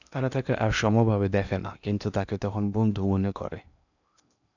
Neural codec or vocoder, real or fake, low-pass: codec, 16 kHz in and 24 kHz out, 0.8 kbps, FocalCodec, streaming, 65536 codes; fake; 7.2 kHz